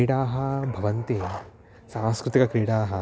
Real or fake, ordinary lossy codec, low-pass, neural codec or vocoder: real; none; none; none